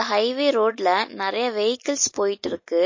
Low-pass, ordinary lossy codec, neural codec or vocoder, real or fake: 7.2 kHz; MP3, 32 kbps; none; real